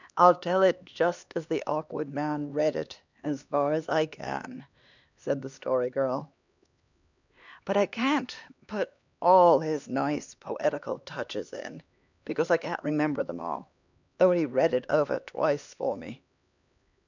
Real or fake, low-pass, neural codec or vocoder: fake; 7.2 kHz; codec, 16 kHz, 2 kbps, X-Codec, HuBERT features, trained on LibriSpeech